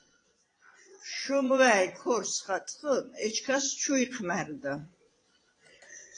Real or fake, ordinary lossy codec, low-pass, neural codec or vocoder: fake; AAC, 32 kbps; 9.9 kHz; vocoder, 22.05 kHz, 80 mel bands, Vocos